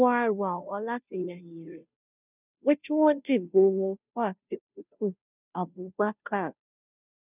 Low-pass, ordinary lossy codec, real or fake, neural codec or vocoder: 3.6 kHz; none; fake; codec, 16 kHz, 0.5 kbps, FunCodec, trained on Chinese and English, 25 frames a second